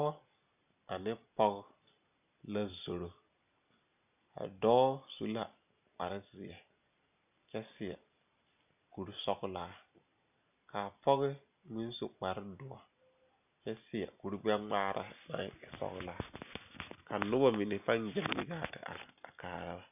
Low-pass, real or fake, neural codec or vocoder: 3.6 kHz; real; none